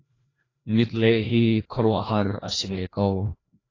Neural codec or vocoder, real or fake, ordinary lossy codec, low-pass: codec, 16 kHz, 1 kbps, FreqCodec, larger model; fake; AAC, 32 kbps; 7.2 kHz